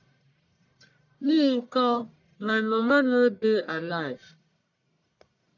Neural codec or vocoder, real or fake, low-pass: codec, 44.1 kHz, 1.7 kbps, Pupu-Codec; fake; 7.2 kHz